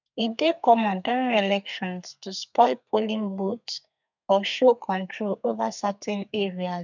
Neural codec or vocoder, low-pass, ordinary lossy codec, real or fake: codec, 32 kHz, 1.9 kbps, SNAC; 7.2 kHz; none; fake